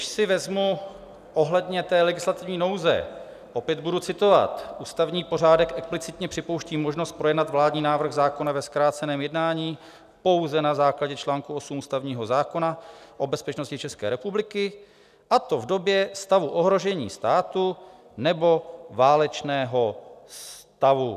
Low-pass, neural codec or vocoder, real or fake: 14.4 kHz; none; real